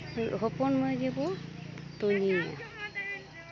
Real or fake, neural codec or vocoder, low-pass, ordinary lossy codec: real; none; 7.2 kHz; none